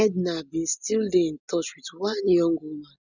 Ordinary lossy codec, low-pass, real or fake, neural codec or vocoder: none; 7.2 kHz; real; none